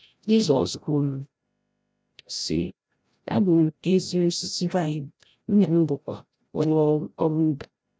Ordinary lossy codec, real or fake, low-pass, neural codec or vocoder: none; fake; none; codec, 16 kHz, 0.5 kbps, FreqCodec, larger model